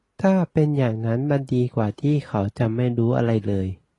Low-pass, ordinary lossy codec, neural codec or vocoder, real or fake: 10.8 kHz; AAC, 32 kbps; none; real